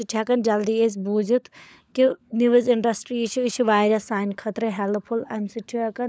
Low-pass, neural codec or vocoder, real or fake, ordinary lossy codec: none; codec, 16 kHz, 16 kbps, FunCodec, trained on LibriTTS, 50 frames a second; fake; none